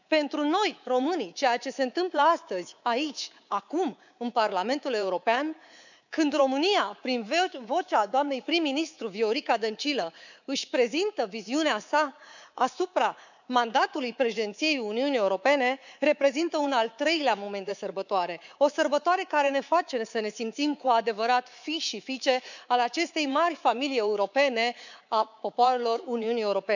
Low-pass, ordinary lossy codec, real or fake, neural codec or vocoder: 7.2 kHz; none; fake; codec, 24 kHz, 3.1 kbps, DualCodec